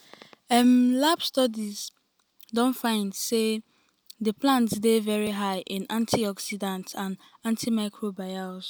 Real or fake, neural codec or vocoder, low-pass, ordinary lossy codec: real; none; none; none